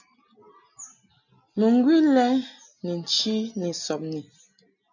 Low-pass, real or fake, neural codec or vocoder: 7.2 kHz; real; none